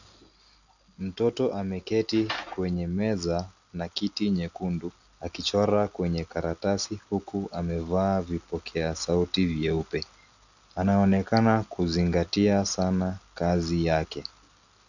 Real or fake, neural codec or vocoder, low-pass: real; none; 7.2 kHz